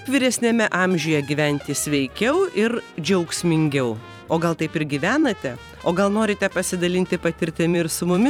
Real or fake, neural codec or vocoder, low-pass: fake; vocoder, 44.1 kHz, 128 mel bands every 512 samples, BigVGAN v2; 19.8 kHz